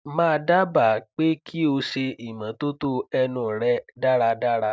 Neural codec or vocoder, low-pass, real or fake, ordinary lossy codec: none; none; real; none